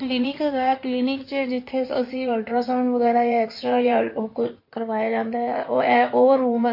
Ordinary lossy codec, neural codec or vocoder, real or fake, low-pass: MP3, 32 kbps; codec, 16 kHz in and 24 kHz out, 2.2 kbps, FireRedTTS-2 codec; fake; 5.4 kHz